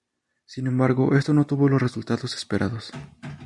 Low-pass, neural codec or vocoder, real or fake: 10.8 kHz; none; real